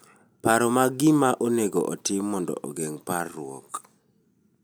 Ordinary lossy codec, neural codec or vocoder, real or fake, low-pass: none; none; real; none